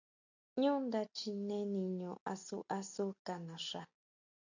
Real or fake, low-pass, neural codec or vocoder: real; 7.2 kHz; none